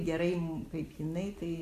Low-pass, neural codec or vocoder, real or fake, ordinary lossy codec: 14.4 kHz; none; real; AAC, 64 kbps